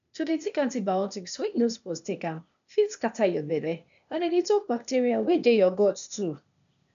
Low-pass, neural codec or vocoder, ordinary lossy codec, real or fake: 7.2 kHz; codec, 16 kHz, 0.8 kbps, ZipCodec; none; fake